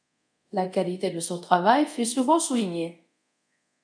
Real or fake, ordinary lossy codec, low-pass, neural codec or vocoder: fake; MP3, 96 kbps; 9.9 kHz; codec, 24 kHz, 0.5 kbps, DualCodec